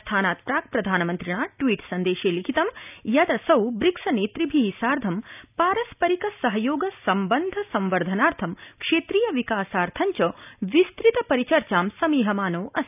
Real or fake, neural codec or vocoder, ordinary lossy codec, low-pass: real; none; none; 3.6 kHz